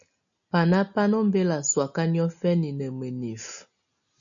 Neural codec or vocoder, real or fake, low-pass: none; real; 7.2 kHz